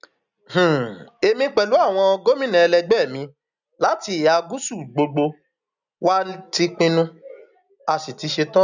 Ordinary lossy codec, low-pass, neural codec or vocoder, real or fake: none; 7.2 kHz; none; real